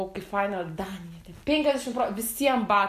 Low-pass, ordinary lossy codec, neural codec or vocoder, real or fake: 14.4 kHz; MP3, 64 kbps; none; real